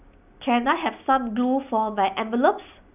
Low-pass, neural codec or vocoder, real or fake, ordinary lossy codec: 3.6 kHz; none; real; none